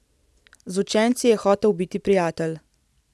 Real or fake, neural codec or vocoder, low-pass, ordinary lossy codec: real; none; none; none